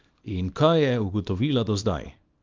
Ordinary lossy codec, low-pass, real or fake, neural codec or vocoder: Opus, 24 kbps; 7.2 kHz; fake; codec, 24 kHz, 0.9 kbps, WavTokenizer, small release